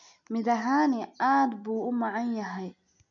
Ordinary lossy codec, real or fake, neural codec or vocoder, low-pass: none; real; none; 7.2 kHz